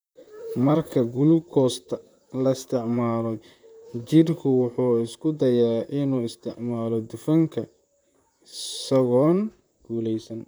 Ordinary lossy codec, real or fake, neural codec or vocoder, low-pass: none; fake; vocoder, 44.1 kHz, 128 mel bands, Pupu-Vocoder; none